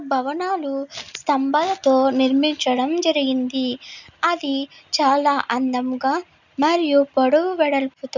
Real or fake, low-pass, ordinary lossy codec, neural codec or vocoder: real; 7.2 kHz; none; none